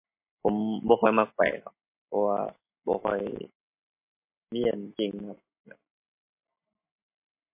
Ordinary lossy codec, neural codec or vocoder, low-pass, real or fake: MP3, 24 kbps; none; 3.6 kHz; real